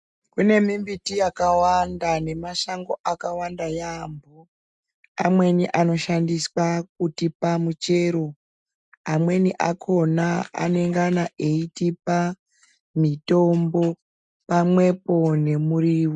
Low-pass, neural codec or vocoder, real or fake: 10.8 kHz; none; real